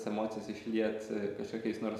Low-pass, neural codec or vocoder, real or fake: 14.4 kHz; none; real